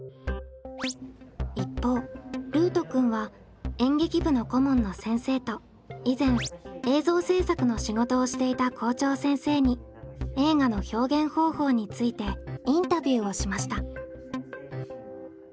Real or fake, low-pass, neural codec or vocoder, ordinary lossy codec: real; none; none; none